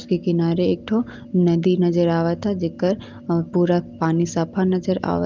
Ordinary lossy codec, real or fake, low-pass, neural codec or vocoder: Opus, 32 kbps; real; 7.2 kHz; none